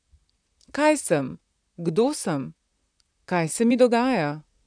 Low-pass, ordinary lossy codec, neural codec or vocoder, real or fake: 9.9 kHz; none; vocoder, 44.1 kHz, 128 mel bands, Pupu-Vocoder; fake